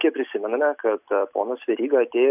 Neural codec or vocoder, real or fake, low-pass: none; real; 3.6 kHz